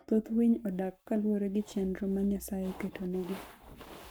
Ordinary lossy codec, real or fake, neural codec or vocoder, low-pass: none; fake; codec, 44.1 kHz, 7.8 kbps, Pupu-Codec; none